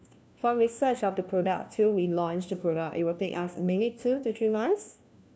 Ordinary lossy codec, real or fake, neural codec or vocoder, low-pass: none; fake; codec, 16 kHz, 1 kbps, FunCodec, trained on LibriTTS, 50 frames a second; none